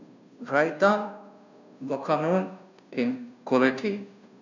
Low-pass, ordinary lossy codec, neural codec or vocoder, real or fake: 7.2 kHz; none; codec, 16 kHz, 0.5 kbps, FunCodec, trained on Chinese and English, 25 frames a second; fake